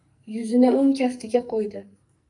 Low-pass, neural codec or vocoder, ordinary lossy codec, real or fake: 10.8 kHz; codec, 44.1 kHz, 2.6 kbps, SNAC; AAC, 64 kbps; fake